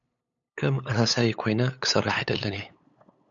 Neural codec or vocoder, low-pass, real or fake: codec, 16 kHz, 8 kbps, FunCodec, trained on LibriTTS, 25 frames a second; 7.2 kHz; fake